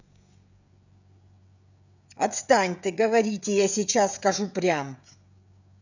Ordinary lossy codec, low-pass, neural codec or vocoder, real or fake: none; 7.2 kHz; codec, 16 kHz, 16 kbps, FreqCodec, smaller model; fake